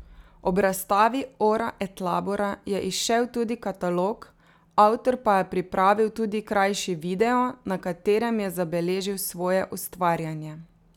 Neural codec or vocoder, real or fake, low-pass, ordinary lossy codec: none; real; 19.8 kHz; none